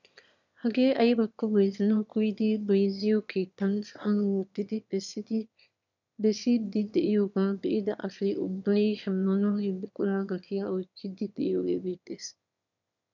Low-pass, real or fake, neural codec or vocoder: 7.2 kHz; fake; autoencoder, 22.05 kHz, a latent of 192 numbers a frame, VITS, trained on one speaker